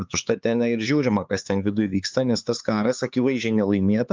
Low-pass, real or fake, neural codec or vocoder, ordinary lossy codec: 7.2 kHz; fake; codec, 16 kHz, 4 kbps, X-Codec, HuBERT features, trained on LibriSpeech; Opus, 32 kbps